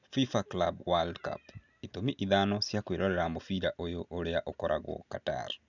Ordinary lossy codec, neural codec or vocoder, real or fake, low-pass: none; none; real; 7.2 kHz